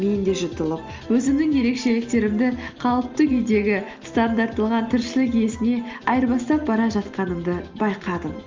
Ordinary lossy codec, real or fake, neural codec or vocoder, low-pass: Opus, 32 kbps; real; none; 7.2 kHz